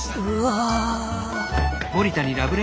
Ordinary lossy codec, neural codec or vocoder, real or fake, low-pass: none; none; real; none